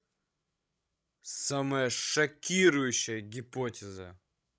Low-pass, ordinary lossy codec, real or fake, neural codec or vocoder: none; none; fake; codec, 16 kHz, 16 kbps, FreqCodec, larger model